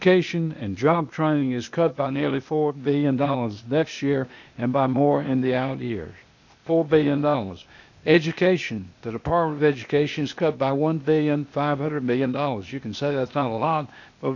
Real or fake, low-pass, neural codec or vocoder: fake; 7.2 kHz; codec, 16 kHz, 0.8 kbps, ZipCodec